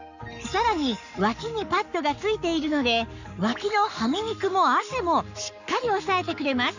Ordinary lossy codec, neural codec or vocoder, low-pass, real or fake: none; codec, 44.1 kHz, 7.8 kbps, Pupu-Codec; 7.2 kHz; fake